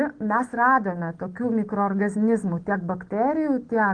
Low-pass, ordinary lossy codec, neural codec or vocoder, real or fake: 9.9 kHz; Opus, 16 kbps; none; real